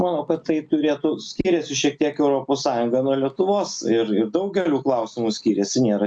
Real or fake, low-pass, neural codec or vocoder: real; 9.9 kHz; none